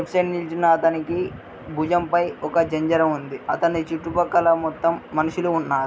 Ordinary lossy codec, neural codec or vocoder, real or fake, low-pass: none; none; real; none